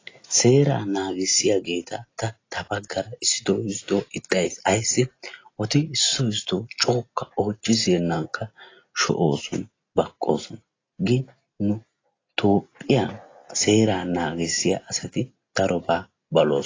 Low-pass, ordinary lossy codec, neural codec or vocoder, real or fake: 7.2 kHz; AAC, 32 kbps; none; real